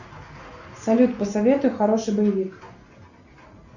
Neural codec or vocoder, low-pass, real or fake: none; 7.2 kHz; real